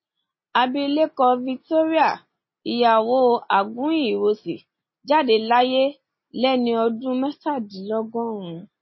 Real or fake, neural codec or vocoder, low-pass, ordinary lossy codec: real; none; 7.2 kHz; MP3, 24 kbps